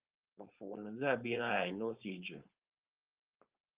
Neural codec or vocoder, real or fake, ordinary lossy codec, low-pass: codec, 16 kHz, 4.8 kbps, FACodec; fake; Opus, 24 kbps; 3.6 kHz